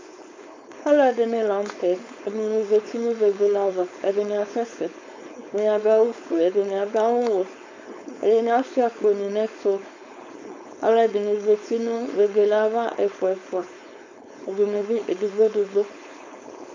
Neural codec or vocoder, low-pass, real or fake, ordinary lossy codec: codec, 16 kHz, 4.8 kbps, FACodec; 7.2 kHz; fake; MP3, 64 kbps